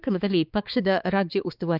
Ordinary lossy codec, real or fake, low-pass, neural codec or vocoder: Opus, 32 kbps; fake; 5.4 kHz; codec, 16 kHz, 4 kbps, X-Codec, HuBERT features, trained on balanced general audio